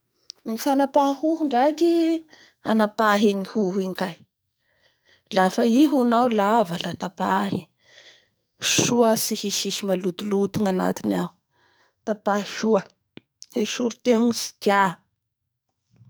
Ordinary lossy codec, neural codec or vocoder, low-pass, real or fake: none; codec, 44.1 kHz, 2.6 kbps, SNAC; none; fake